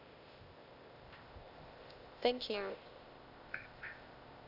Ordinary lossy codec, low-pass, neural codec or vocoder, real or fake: none; 5.4 kHz; codec, 16 kHz, 0.8 kbps, ZipCodec; fake